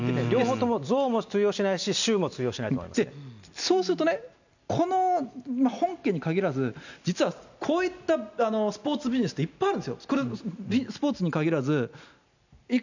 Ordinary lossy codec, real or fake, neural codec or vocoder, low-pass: none; real; none; 7.2 kHz